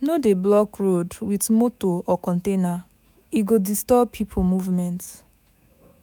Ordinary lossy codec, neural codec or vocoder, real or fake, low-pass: none; autoencoder, 48 kHz, 128 numbers a frame, DAC-VAE, trained on Japanese speech; fake; none